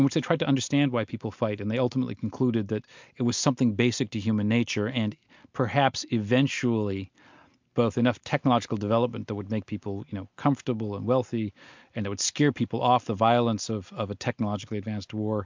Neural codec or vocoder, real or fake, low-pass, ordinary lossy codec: none; real; 7.2 kHz; MP3, 64 kbps